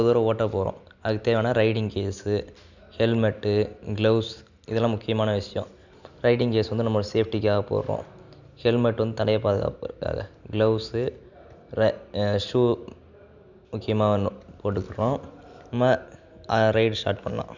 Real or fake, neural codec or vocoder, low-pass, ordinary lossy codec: real; none; 7.2 kHz; none